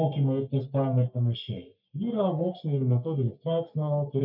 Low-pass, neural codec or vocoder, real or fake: 5.4 kHz; codec, 44.1 kHz, 3.4 kbps, Pupu-Codec; fake